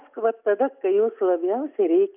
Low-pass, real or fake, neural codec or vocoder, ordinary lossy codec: 3.6 kHz; real; none; AAC, 32 kbps